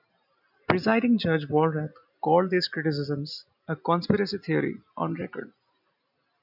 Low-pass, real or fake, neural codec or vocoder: 5.4 kHz; real; none